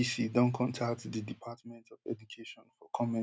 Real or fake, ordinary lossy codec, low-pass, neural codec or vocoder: real; none; none; none